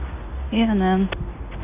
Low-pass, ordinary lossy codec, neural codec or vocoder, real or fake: 3.6 kHz; AAC, 24 kbps; none; real